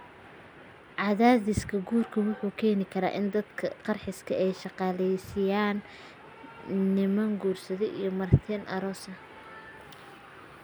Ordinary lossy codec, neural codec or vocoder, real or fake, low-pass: none; none; real; none